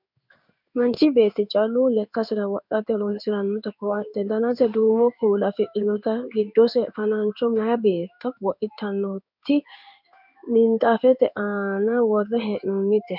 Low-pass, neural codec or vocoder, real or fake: 5.4 kHz; codec, 16 kHz in and 24 kHz out, 1 kbps, XY-Tokenizer; fake